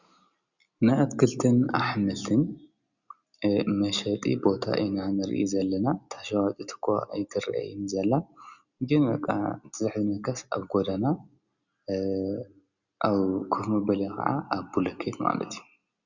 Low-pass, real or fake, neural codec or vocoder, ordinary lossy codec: 7.2 kHz; real; none; Opus, 64 kbps